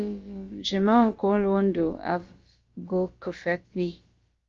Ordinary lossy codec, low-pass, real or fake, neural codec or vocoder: Opus, 32 kbps; 7.2 kHz; fake; codec, 16 kHz, about 1 kbps, DyCAST, with the encoder's durations